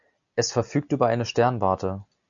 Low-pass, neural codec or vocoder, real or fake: 7.2 kHz; none; real